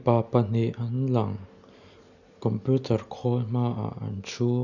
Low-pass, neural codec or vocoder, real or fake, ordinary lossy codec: 7.2 kHz; none; real; none